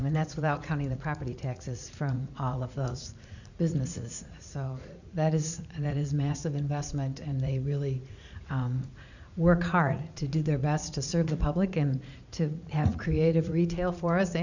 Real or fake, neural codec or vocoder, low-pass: fake; vocoder, 44.1 kHz, 80 mel bands, Vocos; 7.2 kHz